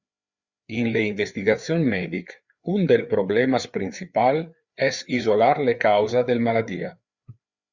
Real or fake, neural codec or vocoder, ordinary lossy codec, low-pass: fake; codec, 16 kHz, 4 kbps, FreqCodec, larger model; Opus, 64 kbps; 7.2 kHz